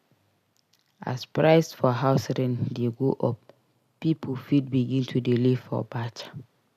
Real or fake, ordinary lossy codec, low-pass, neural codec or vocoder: real; none; 14.4 kHz; none